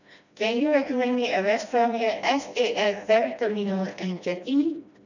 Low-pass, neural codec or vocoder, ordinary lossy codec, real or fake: 7.2 kHz; codec, 16 kHz, 1 kbps, FreqCodec, smaller model; none; fake